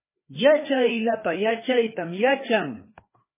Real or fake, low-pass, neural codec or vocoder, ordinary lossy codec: fake; 3.6 kHz; codec, 16 kHz, 2 kbps, FreqCodec, larger model; MP3, 16 kbps